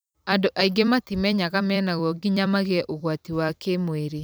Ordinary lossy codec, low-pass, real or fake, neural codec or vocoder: none; none; fake; vocoder, 44.1 kHz, 128 mel bands every 256 samples, BigVGAN v2